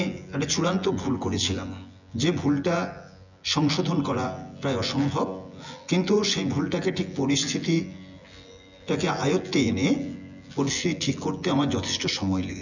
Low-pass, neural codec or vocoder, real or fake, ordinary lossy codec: 7.2 kHz; vocoder, 24 kHz, 100 mel bands, Vocos; fake; none